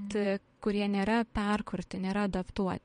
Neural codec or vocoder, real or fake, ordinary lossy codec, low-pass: vocoder, 22.05 kHz, 80 mel bands, WaveNeXt; fake; MP3, 48 kbps; 9.9 kHz